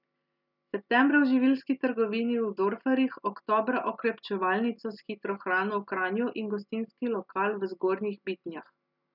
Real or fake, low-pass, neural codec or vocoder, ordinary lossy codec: real; 5.4 kHz; none; none